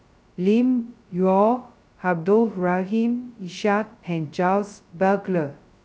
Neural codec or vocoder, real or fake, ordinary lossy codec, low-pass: codec, 16 kHz, 0.2 kbps, FocalCodec; fake; none; none